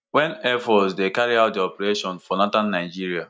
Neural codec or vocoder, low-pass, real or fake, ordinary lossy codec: none; none; real; none